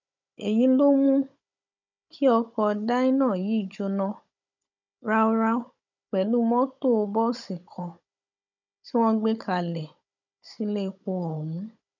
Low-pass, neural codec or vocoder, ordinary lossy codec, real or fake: 7.2 kHz; codec, 16 kHz, 16 kbps, FunCodec, trained on Chinese and English, 50 frames a second; none; fake